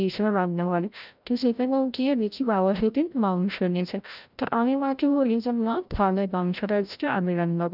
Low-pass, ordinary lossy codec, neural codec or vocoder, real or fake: 5.4 kHz; none; codec, 16 kHz, 0.5 kbps, FreqCodec, larger model; fake